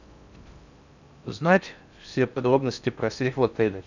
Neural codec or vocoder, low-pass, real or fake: codec, 16 kHz in and 24 kHz out, 0.6 kbps, FocalCodec, streaming, 4096 codes; 7.2 kHz; fake